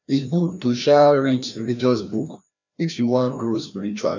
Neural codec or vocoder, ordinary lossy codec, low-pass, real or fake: codec, 16 kHz, 1 kbps, FreqCodec, larger model; none; 7.2 kHz; fake